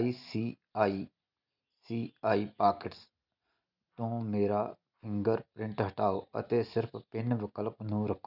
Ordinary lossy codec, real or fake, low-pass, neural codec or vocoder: none; real; 5.4 kHz; none